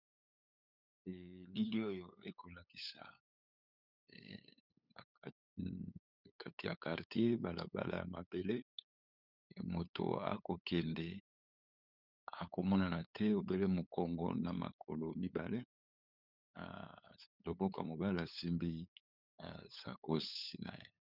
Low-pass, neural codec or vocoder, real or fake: 5.4 kHz; codec, 16 kHz, 8 kbps, FunCodec, trained on LibriTTS, 25 frames a second; fake